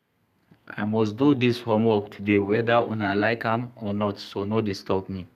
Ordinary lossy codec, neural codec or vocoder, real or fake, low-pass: none; codec, 32 kHz, 1.9 kbps, SNAC; fake; 14.4 kHz